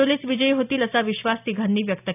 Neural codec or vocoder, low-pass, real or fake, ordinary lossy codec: none; 3.6 kHz; real; none